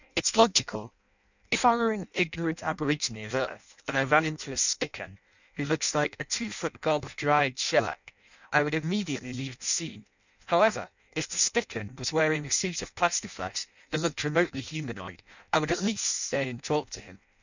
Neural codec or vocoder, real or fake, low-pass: codec, 16 kHz in and 24 kHz out, 0.6 kbps, FireRedTTS-2 codec; fake; 7.2 kHz